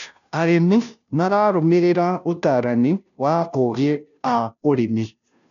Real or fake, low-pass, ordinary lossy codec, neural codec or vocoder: fake; 7.2 kHz; none; codec, 16 kHz, 0.5 kbps, FunCodec, trained on Chinese and English, 25 frames a second